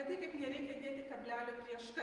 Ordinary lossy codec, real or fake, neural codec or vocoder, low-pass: Opus, 24 kbps; fake; vocoder, 48 kHz, 128 mel bands, Vocos; 10.8 kHz